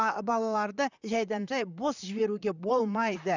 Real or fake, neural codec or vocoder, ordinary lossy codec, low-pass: fake; vocoder, 44.1 kHz, 128 mel bands, Pupu-Vocoder; none; 7.2 kHz